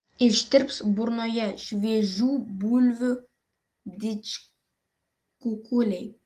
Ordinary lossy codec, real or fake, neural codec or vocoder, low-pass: Opus, 24 kbps; real; none; 14.4 kHz